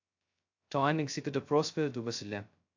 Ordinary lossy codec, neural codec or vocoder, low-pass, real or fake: AAC, 48 kbps; codec, 16 kHz, 0.2 kbps, FocalCodec; 7.2 kHz; fake